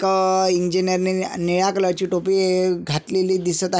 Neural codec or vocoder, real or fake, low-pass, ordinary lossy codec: none; real; none; none